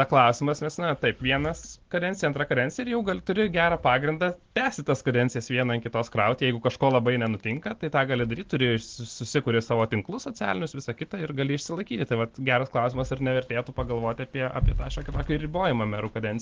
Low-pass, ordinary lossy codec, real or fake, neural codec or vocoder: 7.2 kHz; Opus, 16 kbps; real; none